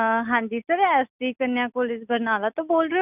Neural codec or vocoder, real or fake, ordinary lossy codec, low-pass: none; real; none; 3.6 kHz